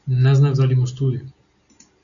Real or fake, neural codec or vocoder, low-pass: real; none; 7.2 kHz